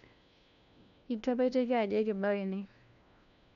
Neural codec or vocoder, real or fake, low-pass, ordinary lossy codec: codec, 16 kHz, 1 kbps, FunCodec, trained on LibriTTS, 50 frames a second; fake; 7.2 kHz; MP3, 96 kbps